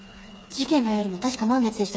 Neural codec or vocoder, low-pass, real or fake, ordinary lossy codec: codec, 16 kHz, 4 kbps, FreqCodec, smaller model; none; fake; none